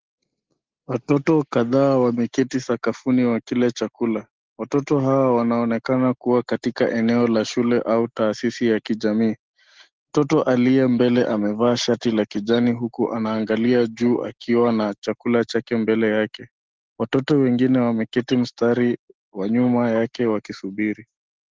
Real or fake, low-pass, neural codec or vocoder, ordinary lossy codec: real; 7.2 kHz; none; Opus, 16 kbps